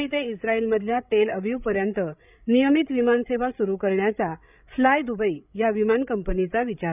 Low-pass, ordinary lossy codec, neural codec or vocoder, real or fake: 3.6 kHz; none; codec, 16 kHz, 8 kbps, FreqCodec, larger model; fake